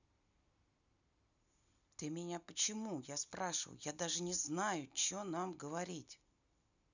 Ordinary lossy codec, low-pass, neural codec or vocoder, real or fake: none; 7.2 kHz; none; real